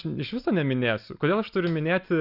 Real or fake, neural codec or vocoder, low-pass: real; none; 5.4 kHz